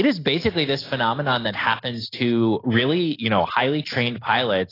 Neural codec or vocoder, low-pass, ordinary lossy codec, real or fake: none; 5.4 kHz; AAC, 24 kbps; real